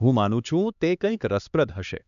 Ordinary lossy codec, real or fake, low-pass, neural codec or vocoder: none; fake; 7.2 kHz; codec, 16 kHz, 2 kbps, X-Codec, HuBERT features, trained on LibriSpeech